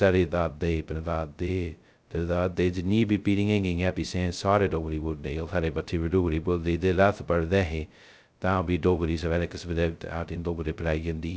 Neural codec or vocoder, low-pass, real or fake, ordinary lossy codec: codec, 16 kHz, 0.2 kbps, FocalCodec; none; fake; none